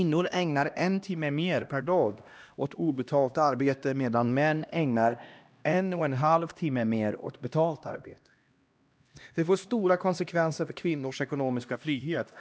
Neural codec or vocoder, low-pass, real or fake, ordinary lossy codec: codec, 16 kHz, 1 kbps, X-Codec, HuBERT features, trained on LibriSpeech; none; fake; none